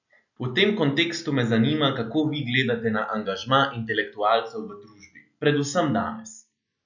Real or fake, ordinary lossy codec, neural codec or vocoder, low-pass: real; none; none; 7.2 kHz